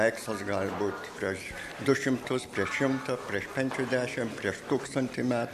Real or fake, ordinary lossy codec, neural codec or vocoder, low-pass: real; MP3, 64 kbps; none; 14.4 kHz